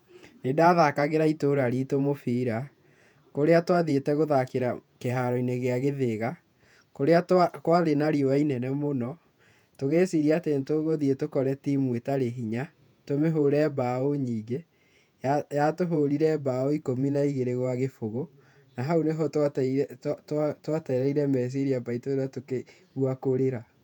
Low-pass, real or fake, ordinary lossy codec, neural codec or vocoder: 19.8 kHz; fake; none; vocoder, 48 kHz, 128 mel bands, Vocos